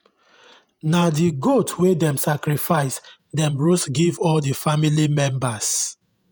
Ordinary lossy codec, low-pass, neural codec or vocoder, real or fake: none; none; vocoder, 48 kHz, 128 mel bands, Vocos; fake